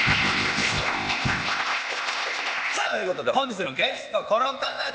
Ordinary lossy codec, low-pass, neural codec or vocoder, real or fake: none; none; codec, 16 kHz, 0.8 kbps, ZipCodec; fake